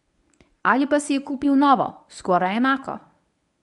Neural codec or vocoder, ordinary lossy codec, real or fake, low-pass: codec, 24 kHz, 0.9 kbps, WavTokenizer, medium speech release version 1; none; fake; 10.8 kHz